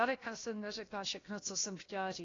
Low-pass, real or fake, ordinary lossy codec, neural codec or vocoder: 7.2 kHz; fake; AAC, 32 kbps; codec, 16 kHz, about 1 kbps, DyCAST, with the encoder's durations